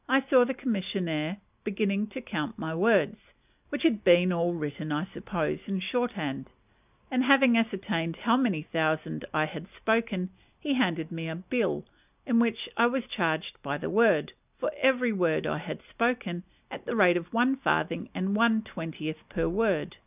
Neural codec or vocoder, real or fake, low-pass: none; real; 3.6 kHz